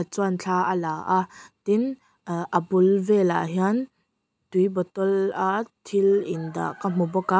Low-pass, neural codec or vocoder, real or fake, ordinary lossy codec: none; none; real; none